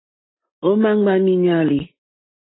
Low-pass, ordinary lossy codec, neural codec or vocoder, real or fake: 7.2 kHz; AAC, 16 kbps; none; real